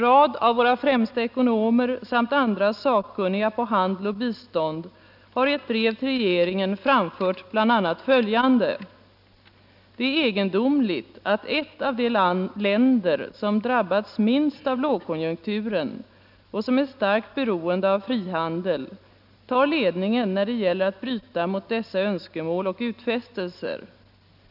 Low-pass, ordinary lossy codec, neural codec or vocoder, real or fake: 5.4 kHz; none; none; real